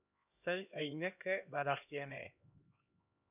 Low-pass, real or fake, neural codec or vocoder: 3.6 kHz; fake; codec, 16 kHz, 1 kbps, X-Codec, HuBERT features, trained on LibriSpeech